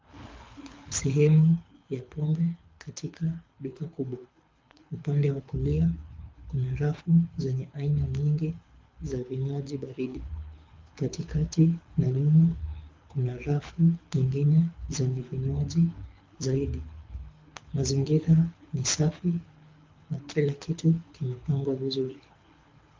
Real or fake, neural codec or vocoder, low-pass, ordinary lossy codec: fake; codec, 24 kHz, 6 kbps, HILCodec; 7.2 kHz; Opus, 24 kbps